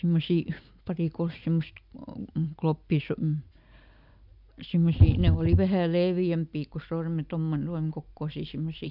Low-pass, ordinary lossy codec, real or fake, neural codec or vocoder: 5.4 kHz; none; real; none